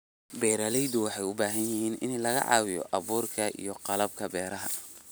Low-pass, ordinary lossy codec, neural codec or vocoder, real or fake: none; none; none; real